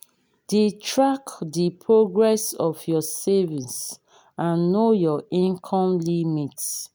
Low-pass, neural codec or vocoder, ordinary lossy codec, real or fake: none; none; none; real